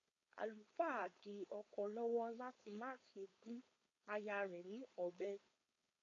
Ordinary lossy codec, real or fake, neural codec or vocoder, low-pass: AAC, 32 kbps; fake; codec, 16 kHz, 4.8 kbps, FACodec; 7.2 kHz